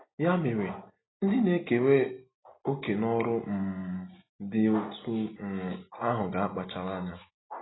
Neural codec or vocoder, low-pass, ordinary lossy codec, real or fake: none; 7.2 kHz; AAC, 16 kbps; real